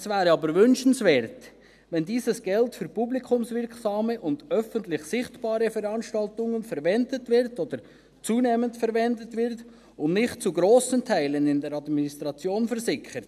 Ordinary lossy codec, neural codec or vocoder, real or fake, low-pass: none; none; real; 14.4 kHz